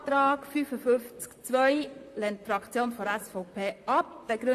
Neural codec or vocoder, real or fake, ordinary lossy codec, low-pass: vocoder, 44.1 kHz, 128 mel bands, Pupu-Vocoder; fake; AAC, 64 kbps; 14.4 kHz